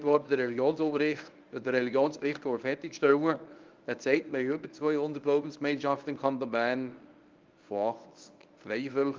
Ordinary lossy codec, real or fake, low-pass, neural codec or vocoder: Opus, 32 kbps; fake; 7.2 kHz; codec, 24 kHz, 0.9 kbps, WavTokenizer, medium speech release version 1